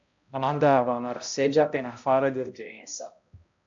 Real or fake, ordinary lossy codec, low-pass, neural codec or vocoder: fake; AAC, 64 kbps; 7.2 kHz; codec, 16 kHz, 0.5 kbps, X-Codec, HuBERT features, trained on balanced general audio